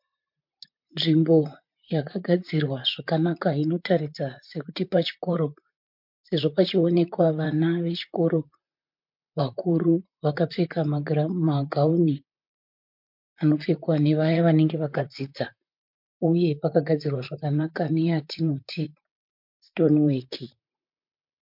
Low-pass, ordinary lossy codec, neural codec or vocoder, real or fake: 5.4 kHz; MP3, 48 kbps; vocoder, 44.1 kHz, 128 mel bands, Pupu-Vocoder; fake